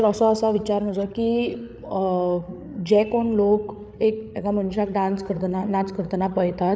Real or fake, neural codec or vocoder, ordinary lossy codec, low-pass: fake; codec, 16 kHz, 8 kbps, FreqCodec, larger model; none; none